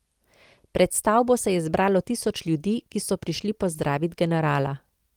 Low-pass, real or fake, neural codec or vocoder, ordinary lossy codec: 19.8 kHz; real; none; Opus, 24 kbps